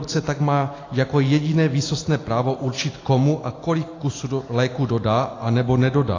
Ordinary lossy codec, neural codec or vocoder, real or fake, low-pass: AAC, 32 kbps; none; real; 7.2 kHz